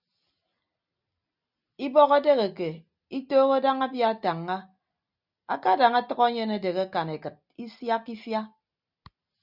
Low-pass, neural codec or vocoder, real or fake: 5.4 kHz; none; real